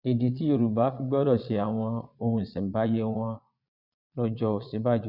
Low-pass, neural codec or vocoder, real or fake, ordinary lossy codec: 5.4 kHz; vocoder, 22.05 kHz, 80 mel bands, Vocos; fake; none